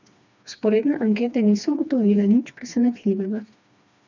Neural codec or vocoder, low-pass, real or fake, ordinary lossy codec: codec, 16 kHz, 2 kbps, FreqCodec, smaller model; 7.2 kHz; fake; none